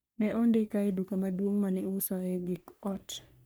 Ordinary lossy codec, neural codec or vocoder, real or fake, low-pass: none; codec, 44.1 kHz, 3.4 kbps, Pupu-Codec; fake; none